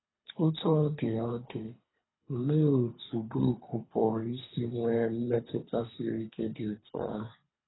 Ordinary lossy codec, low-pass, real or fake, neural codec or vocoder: AAC, 16 kbps; 7.2 kHz; fake; codec, 24 kHz, 3 kbps, HILCodec